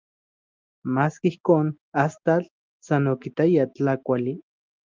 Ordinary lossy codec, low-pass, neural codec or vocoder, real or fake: Opus, 24 kbps; 7.2 kHz; none; real